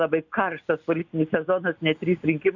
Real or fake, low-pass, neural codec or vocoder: real; 7.2 kHz; none